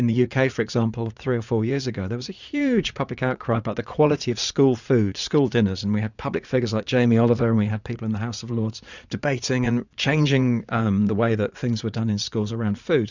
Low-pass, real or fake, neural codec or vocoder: 7.2 kHz; fake; vocoder, 44.1 kHz, 80 mel bands, Vocos